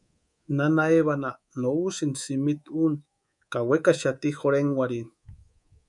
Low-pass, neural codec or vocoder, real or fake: 10.8 kHz; codec, 24 kHz, 3.1 kbps, DualCodec; fake